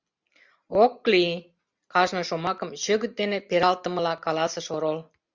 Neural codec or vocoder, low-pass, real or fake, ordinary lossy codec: none; 7.2 kHz; real; Opus, 64 kbps